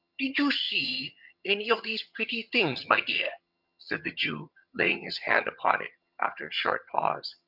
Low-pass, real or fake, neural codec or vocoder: 5.4 kHz; fake; vocoder, 22.05 kHz, 80 mel bands, HiFi-GAN